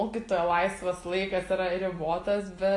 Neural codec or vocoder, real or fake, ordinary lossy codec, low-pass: none; real; AAC, 32 kbps; 10.8 kHz